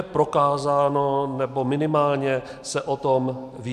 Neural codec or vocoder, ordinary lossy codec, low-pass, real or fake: none; MP3, 96 kbps; 14.4 kHz; real